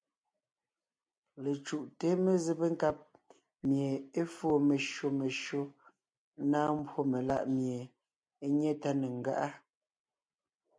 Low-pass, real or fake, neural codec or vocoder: 9.9 kHz; real; none